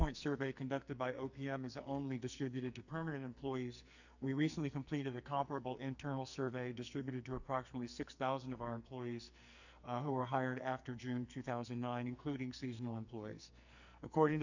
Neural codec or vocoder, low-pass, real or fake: codec, 44.1 kHz, 2.6 kbps, SNAC; 7.2 kHz; fake